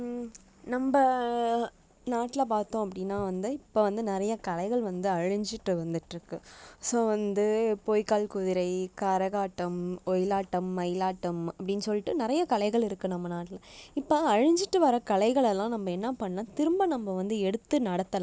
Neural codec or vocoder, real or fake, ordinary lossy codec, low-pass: none; real; none; none